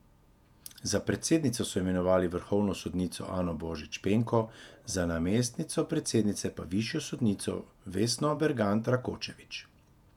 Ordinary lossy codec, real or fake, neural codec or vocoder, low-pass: none; fake; vocoder, 48 kHz, 128 mel bands, Vocos; 19.8 kHz